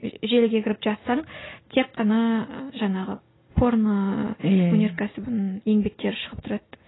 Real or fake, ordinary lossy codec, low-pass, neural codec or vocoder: real; AAC, 16 kbps; 7.2 kHz; none